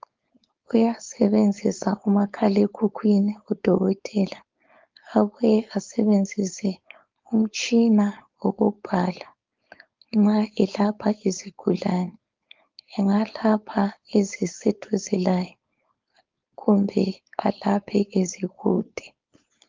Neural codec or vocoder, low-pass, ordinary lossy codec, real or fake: codec, 16 kHz, 4.8 kbps, FACodec; 7.2 kHz; Opus, 32 kbps; fake